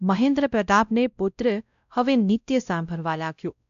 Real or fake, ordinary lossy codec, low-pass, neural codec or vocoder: fake; none; 7.2 kHz; codec, 16 kHz, 0.5 kbps, X-Codec, WavLM features, trained on Multilingual LibriSpeech